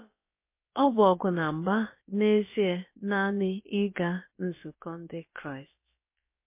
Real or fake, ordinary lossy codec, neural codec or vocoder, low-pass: fake; MP3, 24 kbps; codec, 16 kHz, about 1 kbps, DyCAST, with the encoder's durations; 3.6 kHz